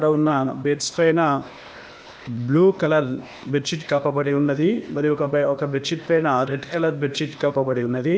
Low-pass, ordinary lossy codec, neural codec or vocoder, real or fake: none; none; codec, 16 kHz, 0.8 kbps, ZipCodec; fake